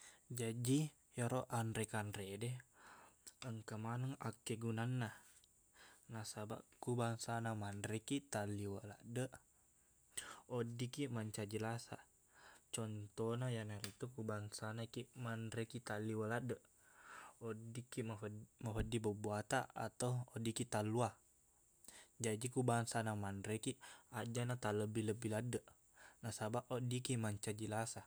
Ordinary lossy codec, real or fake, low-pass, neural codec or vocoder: none; real; none; none